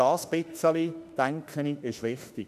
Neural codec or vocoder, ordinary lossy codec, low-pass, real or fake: autoencoder, 48 kHz, 32 numbers a frame, DAC-VAE, trained on Japanese speech; none; 14.4 kHz; fake